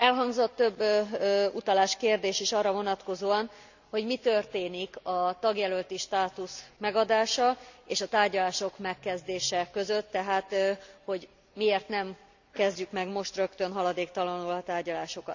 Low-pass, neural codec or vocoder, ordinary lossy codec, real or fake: 7.2 kHz; none; none; real